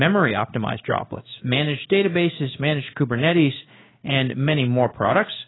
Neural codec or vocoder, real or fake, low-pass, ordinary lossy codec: codec, 16 kHz in and 24 kHz out, 1 kbps, XY-Tokenizer; fake; 7.2 kHz; AAC, 16 kbps